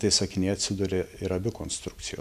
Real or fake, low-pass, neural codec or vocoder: real; 14.4 kHz; none